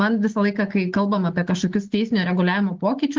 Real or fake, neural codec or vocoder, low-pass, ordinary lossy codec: real; none; 7.2 kHz; Opus, 16 kbps